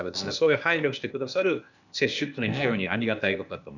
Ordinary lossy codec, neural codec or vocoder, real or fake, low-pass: none; codec, 16 kHz, 0.8 kbps, ZipCodec; fake; 7.2 kHz